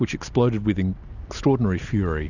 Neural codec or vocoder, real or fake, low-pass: none; real; 7.2 kHz